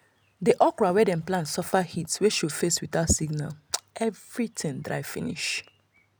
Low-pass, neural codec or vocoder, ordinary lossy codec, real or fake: none; none; none; real